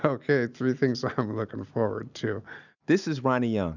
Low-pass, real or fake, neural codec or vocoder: 7.2 kHz; real; none